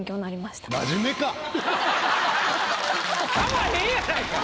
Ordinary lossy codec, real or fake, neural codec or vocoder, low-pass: none; real; none; none